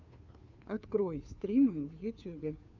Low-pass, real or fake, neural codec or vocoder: 7.2 kHz; fake; codec, 16 kHz, 2 kbps, FunCodec, trained on Chinese and English, 25 frames a second